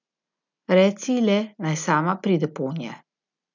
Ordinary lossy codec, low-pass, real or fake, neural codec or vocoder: none; 7.2 kHz; real; none